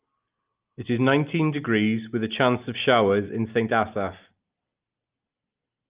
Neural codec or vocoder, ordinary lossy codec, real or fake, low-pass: none; Opus, 24 kbps; real; 3.6 kHz